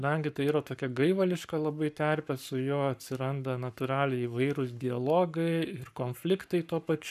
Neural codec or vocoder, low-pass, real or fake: codec, 44.1 kHz, 7.8 kbps, Pupu-Codec; 14.4 kHz; fake